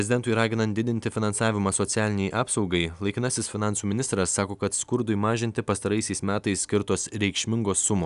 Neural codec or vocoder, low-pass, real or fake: none; 10.8 kHz; real